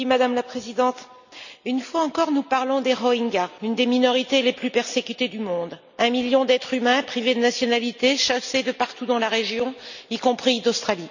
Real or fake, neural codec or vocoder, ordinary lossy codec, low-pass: real; none; none; 7.2 kHz